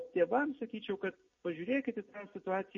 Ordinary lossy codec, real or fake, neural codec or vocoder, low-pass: MP3, 32 kbps; real; none; 7.2 kHz